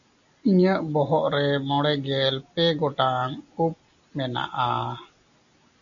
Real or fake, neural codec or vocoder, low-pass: real; none; 7.2 kHz